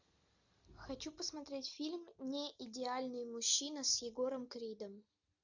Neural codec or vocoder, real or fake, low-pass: none; real; 7.2 kHz